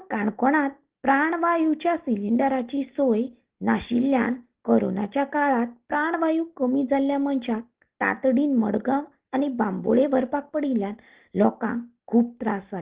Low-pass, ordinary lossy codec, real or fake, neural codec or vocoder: 3.6 kHz; Opus, 16 kbps; real; none